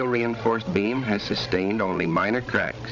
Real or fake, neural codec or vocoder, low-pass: fake; codec, 16 kHz, 16 kbps, FreqCodec, larger model; 7.2 kHz